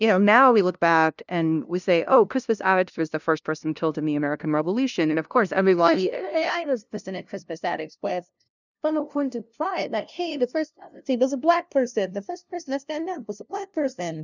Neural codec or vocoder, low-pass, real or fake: codec, 16 kHz, 0.5 kbps, FunCodec, trained on LibriTTS, 25 frames a second; 7.2 kHz; fake